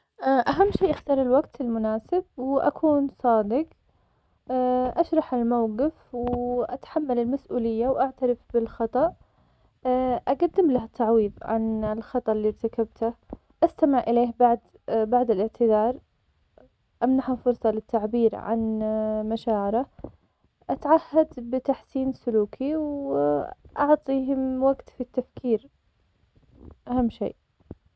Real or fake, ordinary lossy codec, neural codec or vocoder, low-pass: real; none; none; none